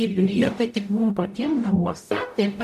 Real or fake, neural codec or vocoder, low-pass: fake; codec, 44.1 kHz, 0.9 kbps, DAC; 14.4 kHz